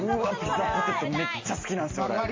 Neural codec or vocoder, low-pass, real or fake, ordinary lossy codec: none; 7.2 kHz; real; MP3, 32 kbps